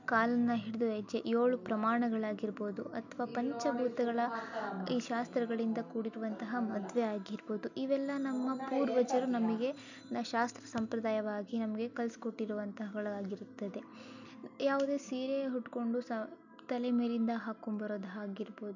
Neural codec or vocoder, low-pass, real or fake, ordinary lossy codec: none; 7.2 kHz; real; none